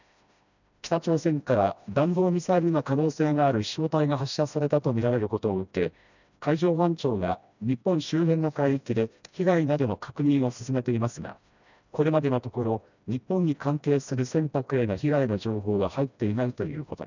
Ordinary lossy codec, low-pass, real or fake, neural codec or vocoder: none; 7.2 kHz; fake; codec, 16 kHz, 1 kbps, FreqCodec, smaller model